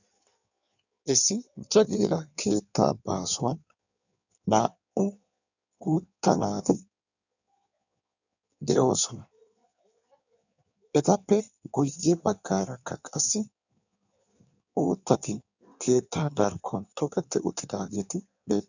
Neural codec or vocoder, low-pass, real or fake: codec, 16 kHz in and 24 kHz out, 1.1 kbps, FireRedTTS-2 codec; 7.2 kHz; fake